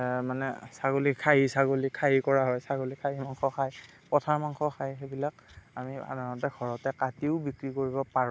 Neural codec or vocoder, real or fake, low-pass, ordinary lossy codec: none; real; none; none